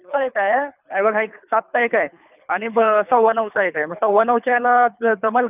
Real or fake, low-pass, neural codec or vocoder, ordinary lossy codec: fake; 3.6 kHz; codec, 24 kHz, 3 kbps, HILCodec; none